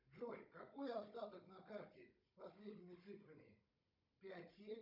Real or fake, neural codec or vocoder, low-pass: fake; codec, 16 kHz, 16 kbps, FunCodec, trained on Chinese and English, 50 frames a second; 5.4 kHz